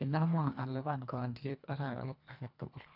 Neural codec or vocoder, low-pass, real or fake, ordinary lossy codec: codec, 24 kHz, 1.5 kbps, HILCodec; 5.4 kHz; fake; AAC, 48 kbps